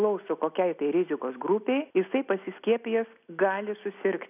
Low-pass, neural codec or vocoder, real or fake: 3.6 kHz; none; real